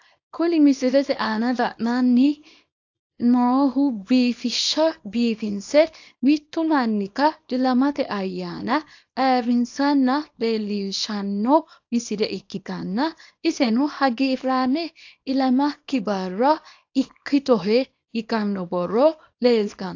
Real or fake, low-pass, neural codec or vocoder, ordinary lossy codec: fake; 7.2 kHz; codec, 24 kHz, 0.9 kbps, WavTokenizer, small release; AAC, 48 kbps